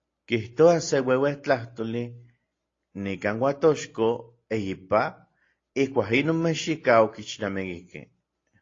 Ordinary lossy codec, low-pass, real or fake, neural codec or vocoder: AAC, 32 kbps; 7.2 kHz; real; none